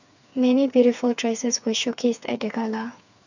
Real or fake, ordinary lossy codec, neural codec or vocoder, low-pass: fake; none; codec, 16 kHz, 4 kbps, FreqCodec, smaller model; 7.2 kHz